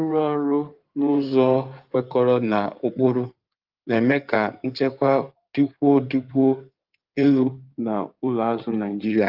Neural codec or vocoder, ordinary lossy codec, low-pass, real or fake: codec, 16 kHz in and 24 kHz out, 2.2 kbps, FireRedTTS-2 codec; Opus, 32 kbps; 5.4 kHz; fake